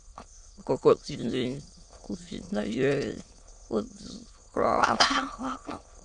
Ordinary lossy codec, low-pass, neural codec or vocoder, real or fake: Opus, 64 kbps; 9.9 kHz; autoencoder, 22.05 kHz, a latent of 192 numbers a frame, VITS, trained on many speakers; fake